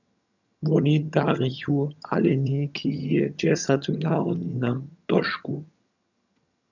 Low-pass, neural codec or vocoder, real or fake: 7.2 kHz; vocoder, 22.05 kHz, 80 mel bands, HiFi-GAN; fake